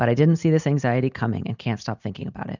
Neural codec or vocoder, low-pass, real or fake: none; 7.2 kHz; real